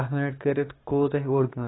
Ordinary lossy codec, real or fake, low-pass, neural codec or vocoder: AAC, 16 kbps; fake; 7.2 kHz; codec, 16 kHz, 6 kbps, DAC